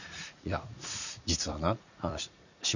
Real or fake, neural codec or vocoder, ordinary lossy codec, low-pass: fake; vocoder, 44.1 kHz, 80 mel bands, Vocos; none; 7.2 kHz